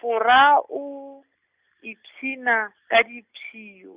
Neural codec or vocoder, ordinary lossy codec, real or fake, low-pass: none; Opus, 64 kbps; real; 3.6 kHz